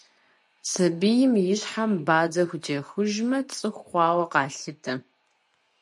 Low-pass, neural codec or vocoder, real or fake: 10.8 kHz; vocoder, 24 kHz, 100 mel bands, Vocos; fake